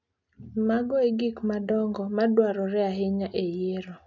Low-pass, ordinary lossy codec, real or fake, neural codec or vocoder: 7.2 kHz; none; real; none